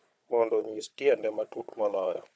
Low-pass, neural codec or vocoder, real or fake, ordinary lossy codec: none; codec, 16 kHz, 4 kbps, FunCodec, trained on Chinese and English, 50 frames a second; fake; none